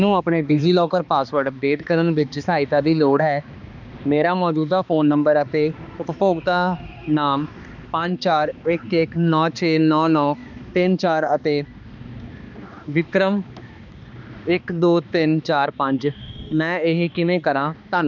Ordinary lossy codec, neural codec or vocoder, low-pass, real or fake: none; codec, 16 kHz, 2 kbps, X-Codec, HuBERT features, trained on balanced general audio; 7.2 kHz; fake